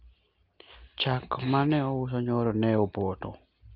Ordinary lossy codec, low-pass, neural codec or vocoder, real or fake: Opus, 24 kbps; 5.4 kHz; none; real